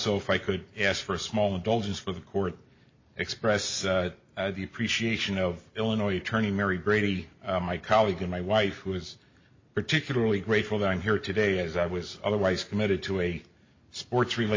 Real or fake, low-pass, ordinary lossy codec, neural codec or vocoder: real; 7.2 kHz; MP3, 32 kbps; none